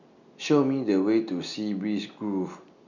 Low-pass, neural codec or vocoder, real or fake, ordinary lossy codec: 7.2 kHz; none; real; none